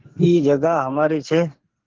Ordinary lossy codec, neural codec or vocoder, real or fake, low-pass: Opus, 16 kbps; codec, 44.1 kHz, 2.6 kbps, SNAC; fake; 7.2 kHz